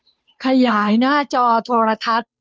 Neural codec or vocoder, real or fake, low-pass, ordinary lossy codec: codec, 16 kHz, 2 kbps, FunCodec, trained on Chinese and English, 25 frames a second; fake; none; none